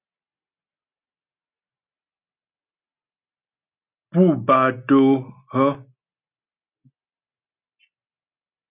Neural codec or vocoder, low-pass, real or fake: none; 3.6 kHz; real